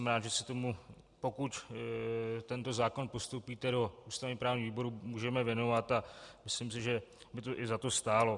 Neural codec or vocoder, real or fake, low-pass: none; real; 10.8 kHz